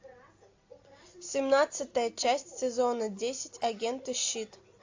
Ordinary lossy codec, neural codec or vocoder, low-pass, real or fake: AAC, 48 kbps; none; 7.2 kHz; real